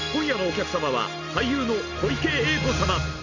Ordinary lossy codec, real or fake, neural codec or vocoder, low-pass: none; real; none; 7.2 kHz